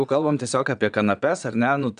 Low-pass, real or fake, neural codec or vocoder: 9.9 kHz; fake; vocoder, 22.05 kHz, 80 mel bands, Vocos